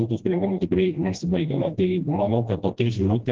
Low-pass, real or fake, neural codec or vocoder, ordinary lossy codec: 7.2 kHz; fake; codec, 16 kHz, 1 kbps, FreqCodec, smaller model; Opus, 16 kbps